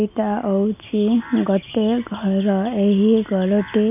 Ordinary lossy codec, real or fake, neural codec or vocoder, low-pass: none; real; none; 3.6 kHz